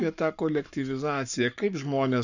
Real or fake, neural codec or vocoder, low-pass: fake; codec, 44.1 kHz, 7.8 kbps, Pupu-Codec; 7.2 kHz